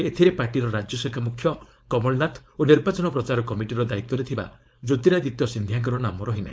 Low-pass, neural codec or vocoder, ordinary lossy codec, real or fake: none; codec, 16 kHz, 4.8 kbps, FACodec; none; fake